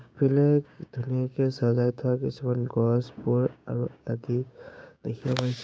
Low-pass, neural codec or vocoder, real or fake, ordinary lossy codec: none; codec, 16 kHz, 6 kbps, DAC; fake; none